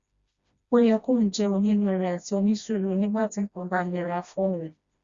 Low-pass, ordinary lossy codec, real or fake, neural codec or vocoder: 7.2 kHz; Opus, 64 kbps; fake; codec, 16 kHz, 1 kbps, FreqCodec, smaller model